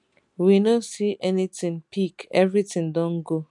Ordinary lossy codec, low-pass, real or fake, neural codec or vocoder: none; 10.8 kHz; real; none